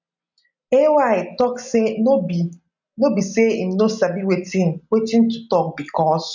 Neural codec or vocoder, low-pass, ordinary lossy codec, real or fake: none; 7.2 kHz; none; real